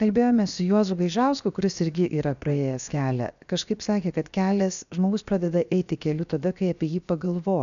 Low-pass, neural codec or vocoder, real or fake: 7.2 kHz; codec, 16 kHz, 0.7 kbps, FocalCodec; fake